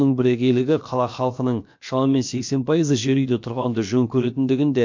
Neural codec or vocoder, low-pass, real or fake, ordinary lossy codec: codec, 16 kHz, about 1 kbps, DyCAST, with the encoder's durations; 7.2 kHz; fake; MP3, 48 kbps